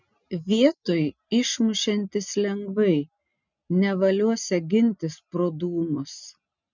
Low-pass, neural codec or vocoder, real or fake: 7.2 kHz; none; real